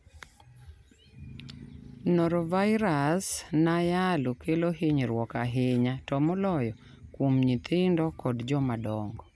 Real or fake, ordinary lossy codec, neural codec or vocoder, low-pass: real; none; none; none